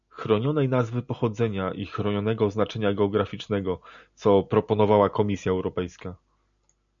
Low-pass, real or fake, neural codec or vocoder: 7.2 kHz; real; none